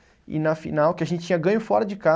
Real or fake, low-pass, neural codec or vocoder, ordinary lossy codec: real; none; none; none